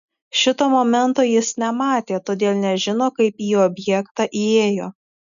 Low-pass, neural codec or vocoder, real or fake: 7.2 kHz; none; real